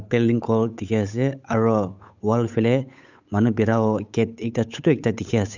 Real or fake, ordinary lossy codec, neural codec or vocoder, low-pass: fake; none; codec, 16 kHz, 8 kbps, FunCodec, trained on Chinese and English, 25 frames a second; 7.2 kHz